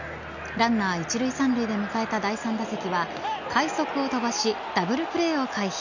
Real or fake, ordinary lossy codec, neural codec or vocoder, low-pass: real; none; none; 7.2 kHz